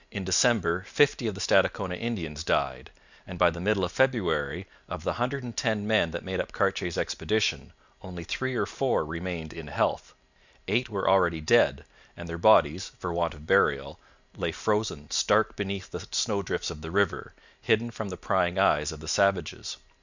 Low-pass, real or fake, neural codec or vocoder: 7.2 kHz; real; none